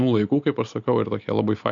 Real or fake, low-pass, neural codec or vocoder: real; 7.2 kHz; none